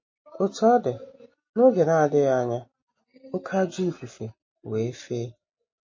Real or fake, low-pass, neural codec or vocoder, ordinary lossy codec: real; 7.2 kHz; none; MP3, 32 kbps